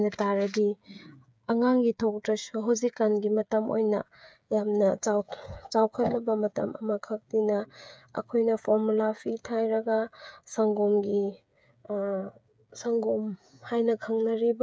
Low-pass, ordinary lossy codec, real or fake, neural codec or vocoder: none; none; fake; codec, 16 kHz, 8 kbps, FreqCodec, smaller model